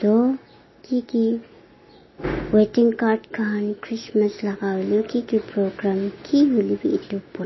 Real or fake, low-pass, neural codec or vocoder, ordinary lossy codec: real; 7.2 kHz; none; MP3, 24 kbps